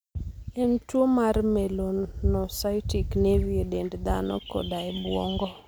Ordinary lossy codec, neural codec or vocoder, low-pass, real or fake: none; none; none; real